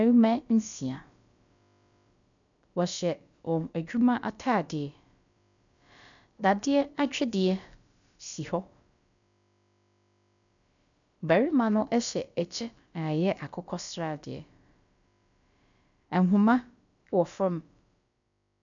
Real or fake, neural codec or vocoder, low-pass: fake; codec, 16 kHz, about 1 kbps, DyCAST, with the encoder's durations; 7.2 kHz